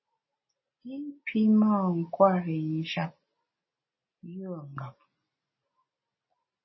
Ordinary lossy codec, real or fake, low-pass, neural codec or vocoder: MP3, 24 kbps; real; 7.2 kHz; none